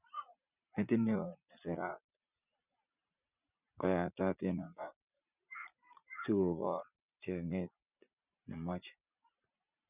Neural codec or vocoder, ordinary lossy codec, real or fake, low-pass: vocoder, 44.1 kHz, 80 mel bands, Vocos; none; fake; 3.6 kHz